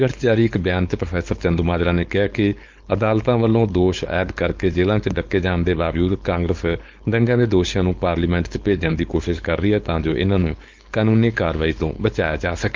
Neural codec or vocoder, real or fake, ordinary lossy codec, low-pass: codec, 16 kHz, 4.8 kbps, FACodec; fake; Opus, 32 kbps; 7.2 kHz